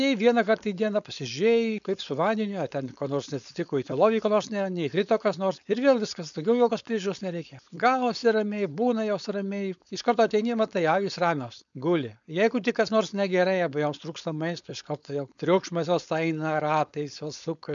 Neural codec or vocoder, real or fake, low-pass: codec, 16 kHz, 4.8 kbps, FACodec; fake; 7.2 kHz